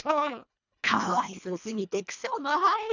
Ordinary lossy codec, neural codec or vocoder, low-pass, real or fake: none; codec, 24 kHz, 1.5 kbps, HILCodec; 7.2 kHz; fake